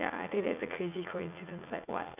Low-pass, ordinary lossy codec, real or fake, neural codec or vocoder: 3.6 kHz; none; fake; vocoder, 44.1 kHz, 80 mel bands, Vocos